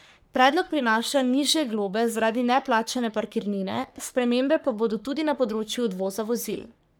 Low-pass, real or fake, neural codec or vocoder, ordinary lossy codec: none; fake; codec, 44.1 kHz, 3.4 kbps, Pupu-Codec; none